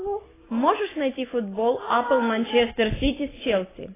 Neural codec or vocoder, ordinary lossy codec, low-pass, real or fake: none; AAC, 16 kbps; 3.6 kHz; real